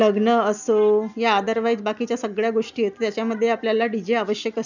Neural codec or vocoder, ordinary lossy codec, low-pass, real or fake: none; none; 7.2 kHz; real